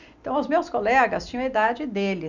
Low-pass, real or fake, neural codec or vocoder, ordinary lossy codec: 7.2 kHz; real; none; none